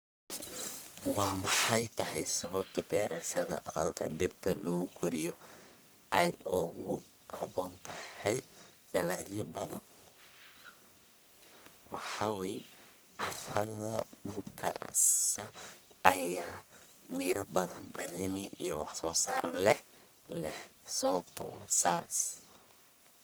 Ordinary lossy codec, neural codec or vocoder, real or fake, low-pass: none; codec, 44.1 kHz, 1.7 kbps, Pupu-Codec; fake; none